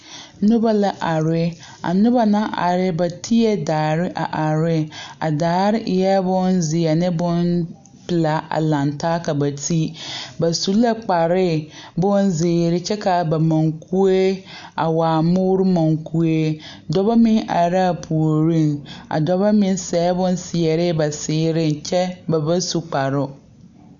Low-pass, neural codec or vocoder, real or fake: 7.2 kHz; none; real